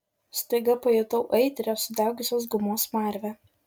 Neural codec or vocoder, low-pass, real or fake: none; 19.8 kHz; real